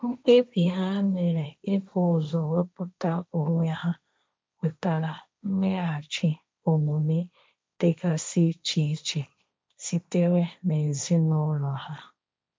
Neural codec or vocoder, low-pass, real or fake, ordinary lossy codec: codec, 16 kHz, 1.1 kbps, Voila-Tokenizer; none; fake; none